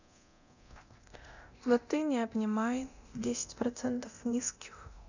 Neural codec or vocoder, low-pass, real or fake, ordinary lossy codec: codec, 24 kHz, 0.9 kbps, DualCodec; 7.2 kHz; fake; none